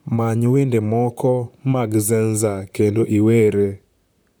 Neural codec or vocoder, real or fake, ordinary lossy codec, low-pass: vocoder, 44.1 kHz, 128 mel bands, Pupu-Vocoder; fake; none; none